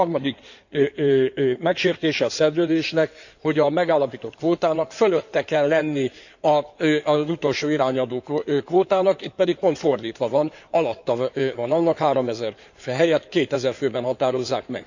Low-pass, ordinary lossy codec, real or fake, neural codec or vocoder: 7.2 kHz; none; fake; codec, 16 kHz in and 24 kHz out, 2.2 kbps, FireRedTTS-2 codec